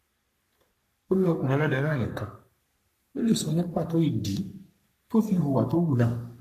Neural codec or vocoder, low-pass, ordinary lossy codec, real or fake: codec, 44.1 kHz, 3.4 kbps, Pupu-Codec; 14.4 kHz; none; fake